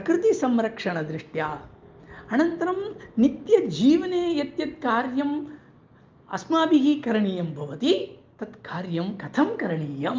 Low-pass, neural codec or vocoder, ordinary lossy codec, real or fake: 7.2 kHz; none; Opus, 24 kbps; real